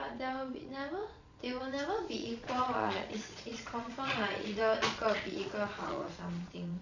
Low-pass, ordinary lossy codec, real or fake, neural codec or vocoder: 7.2 kHz; none; fake; vocoder, 22.05 kHz, 80 mel bands, Vocos